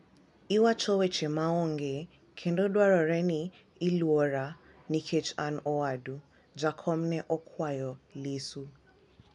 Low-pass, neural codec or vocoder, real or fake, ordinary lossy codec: 10.8 kHz; none; real; none